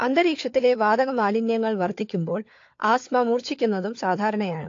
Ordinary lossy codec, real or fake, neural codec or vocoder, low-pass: AAC, 48 kbps; fake; codec, 16 kHz, 4 kbps, FunCodec, trained on LibriTTS, 50 frames a second; 7.2 kHz